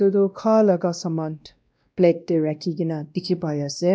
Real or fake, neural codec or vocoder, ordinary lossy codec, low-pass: fake; codec, 16 kHz, 1 kbps, X-Codec, WavLM features, trained on Multilingual LibriSpeech; none; none